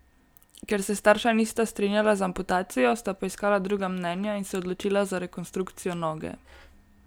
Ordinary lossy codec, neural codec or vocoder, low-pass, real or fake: none; none; none; real